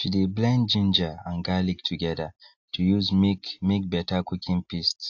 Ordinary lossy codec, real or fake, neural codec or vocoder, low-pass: AAC, 48 kbps; real; none; 7.2 kHz